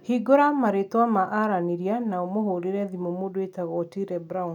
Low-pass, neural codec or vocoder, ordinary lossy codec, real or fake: 19.8 kHz; none; none; real